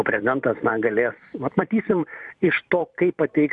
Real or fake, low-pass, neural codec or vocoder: fake; 10.8 kHz; vocoder, 44.1 kHz, 128 mel bands every 256 samples, BigVGAN v2